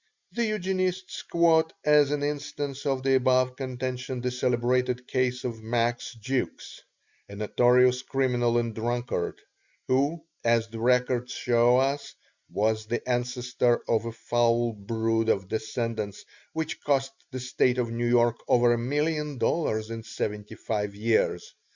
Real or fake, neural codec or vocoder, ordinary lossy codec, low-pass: real; none; Opus, 64 kbps; 7.2 kHz